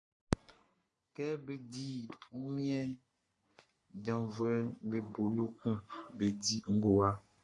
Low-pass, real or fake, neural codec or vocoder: 10.8 kHz; fake; codec, 44.1 kHz, 3.4 kbps, Pupu-Codec